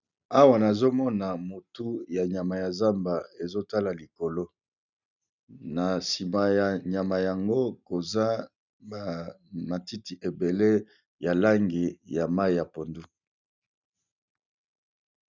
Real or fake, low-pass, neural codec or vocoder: real; 7.2 kHz; none